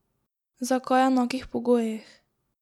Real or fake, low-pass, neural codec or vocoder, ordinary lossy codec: real; 19.8 kHz; none; none